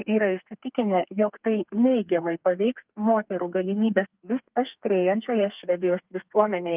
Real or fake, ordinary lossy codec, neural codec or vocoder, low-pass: fake; Opus, 32 kbps; codec, 32 kHz, 1.9 kbps, SNAC; 3.6 kHz